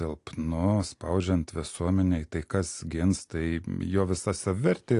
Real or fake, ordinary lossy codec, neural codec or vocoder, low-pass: real; AAC, 48 kbps; none; 10.8 kHz